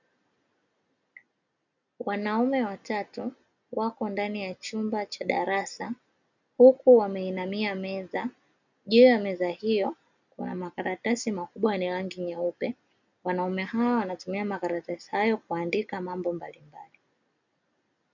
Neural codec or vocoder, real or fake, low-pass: none; real; 7.2 kHz